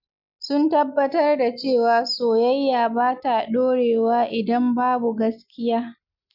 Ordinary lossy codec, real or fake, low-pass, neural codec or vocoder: none; real; 5.4 kHz; none